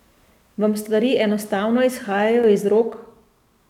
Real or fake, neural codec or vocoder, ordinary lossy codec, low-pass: fake; codec, 44.1 kHz, 7.8 kbps, DAC; none; 19.8 kHz